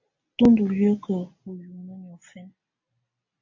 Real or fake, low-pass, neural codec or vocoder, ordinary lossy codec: real; 7.2 kHz; none; AAC, 48 kbps